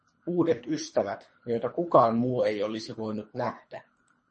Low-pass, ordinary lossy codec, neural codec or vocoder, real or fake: 10.8 kHz; MP3, 32 kbps; codec, 24 kHz, 3 kbps, HILCodec; fake